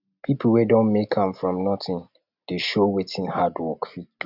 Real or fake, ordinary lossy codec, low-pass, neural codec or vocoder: real; none; 5.4 kHz; none